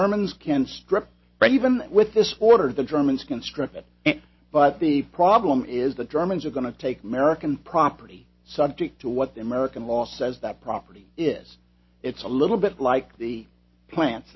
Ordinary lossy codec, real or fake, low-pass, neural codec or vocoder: MP3, 24 kbps; real; 7.2 kHz; none